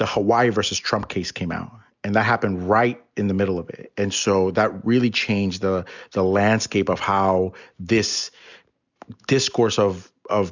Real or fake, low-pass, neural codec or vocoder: real; 7.2 kHz; none